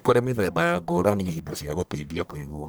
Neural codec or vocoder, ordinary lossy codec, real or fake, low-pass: codec, 44.1 kHz, 1.7 kbps, Pupu-Codec; none; fake; none